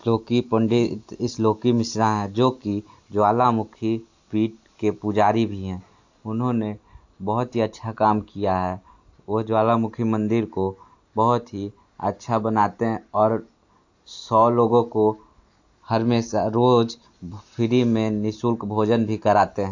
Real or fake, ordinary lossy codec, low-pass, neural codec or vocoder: real; AAC, 48 kbps; 7.2 kHz; none